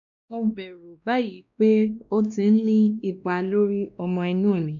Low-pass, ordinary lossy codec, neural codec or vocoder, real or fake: 7.2 kHz; none; codec, 16 kHz, 1 kbps, X-Codec, WavLM features, trained on Multilingual LibriSpeech; fake